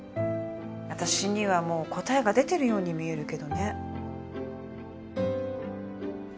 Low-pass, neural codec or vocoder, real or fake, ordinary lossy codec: none; none; real; none